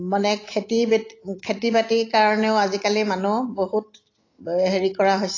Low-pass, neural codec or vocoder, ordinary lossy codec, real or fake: 7.2 kHz; none; AAC, 32 kbps; real